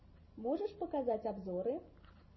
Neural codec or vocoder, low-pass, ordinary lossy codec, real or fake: none; 7.2 kHz; MP3, 24 kbps; real